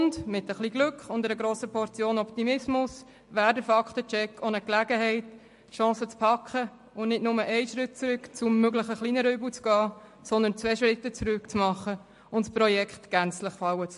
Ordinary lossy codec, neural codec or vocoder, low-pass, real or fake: MP3, 48 kbps; none; 14.4 kHz; real